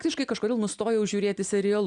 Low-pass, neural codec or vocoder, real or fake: 9.9 kHz; none; real